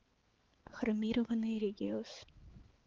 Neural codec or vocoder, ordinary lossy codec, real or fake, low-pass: codec, 16 kHz, 4 kbps, X-Codec, HuBERT features, trained on balanced general audio; Opus, 16 kbps; fake; 7.2 kHz